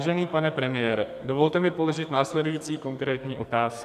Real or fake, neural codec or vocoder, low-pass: fake; codec, 44.1 kHz, 2.6 kbps, SNAC; 14.4 kHz